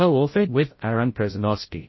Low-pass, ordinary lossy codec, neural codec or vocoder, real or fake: 7.2 kHz; MP3, 24 kbps; codec, 16 kHz, 0.5 kbps, FreqCodec, larger model; fake